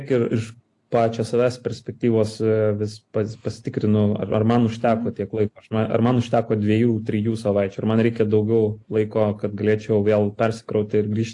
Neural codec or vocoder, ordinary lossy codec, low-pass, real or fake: none; AAC, 48 kbps; 10.8 kHz; real